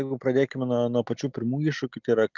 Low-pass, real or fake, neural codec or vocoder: 7.2 kHz; real; none